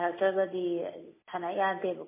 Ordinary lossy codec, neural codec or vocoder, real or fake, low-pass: MP3, 16 kbps; none; real; 3.6 kHz